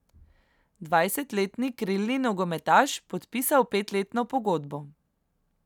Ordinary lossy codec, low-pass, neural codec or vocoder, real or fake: none; 19.8 kHz; none; real